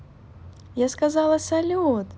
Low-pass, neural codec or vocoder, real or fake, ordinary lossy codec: none; none; real; none